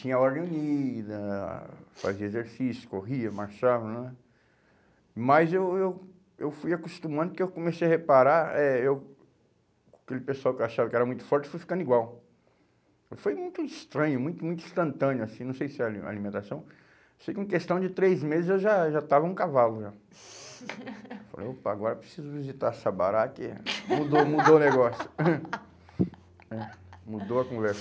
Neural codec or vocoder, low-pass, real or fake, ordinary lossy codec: none; none; real; none